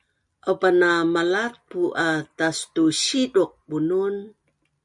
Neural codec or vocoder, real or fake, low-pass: none; real; 10.8 kHz